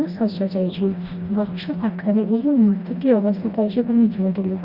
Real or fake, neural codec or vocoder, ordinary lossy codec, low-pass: fake; codec, 16 kHz, 1 kbps, FreqCodec, smaller model; Opus, 64 kbps; 5.4 kHz